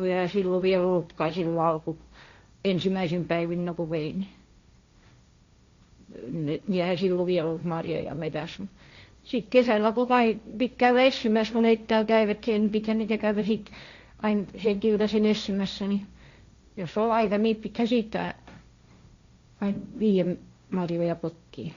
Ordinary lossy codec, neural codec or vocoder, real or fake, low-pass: Opus, 64 kbps; codec, 16 kHz, 1.1 kbps, Voila-Tokenizer; fake; 7.2 kHz